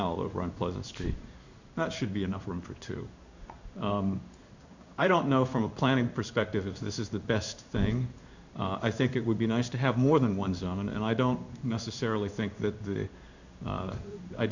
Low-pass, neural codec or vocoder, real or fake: 7.2 kHz; codec, 16 kHz in and 24 kHz out, 1 kbps, XY-Tokenizer; fake